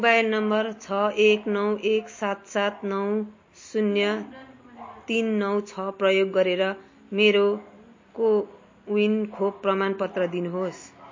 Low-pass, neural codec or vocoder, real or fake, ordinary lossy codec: 7.2 kHz; none; real; MP3, 32 kbps